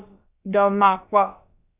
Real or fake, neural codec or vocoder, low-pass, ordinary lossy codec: fake; codec, 16 kHz, about 1 kbps, DyCAST, with the encoder's durations; 3.6 kHz; Opus, 64 kbps